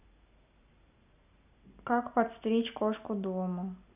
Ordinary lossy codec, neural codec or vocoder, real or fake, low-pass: none; none; real; 3.6 kHz